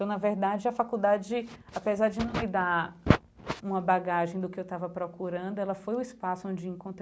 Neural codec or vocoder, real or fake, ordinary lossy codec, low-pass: none; real; none; none